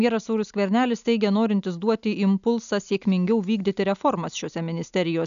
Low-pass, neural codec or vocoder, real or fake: 7.2 kHz; none; real